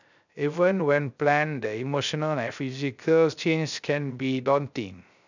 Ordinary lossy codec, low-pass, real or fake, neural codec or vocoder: none; 7.2 kHz; fake; codec, 16 kHz, 0.3 kbps, FocalCodec